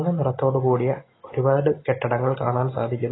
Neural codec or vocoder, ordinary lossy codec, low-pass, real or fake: none; AAC, 16 kbps; 7.2 kHz; real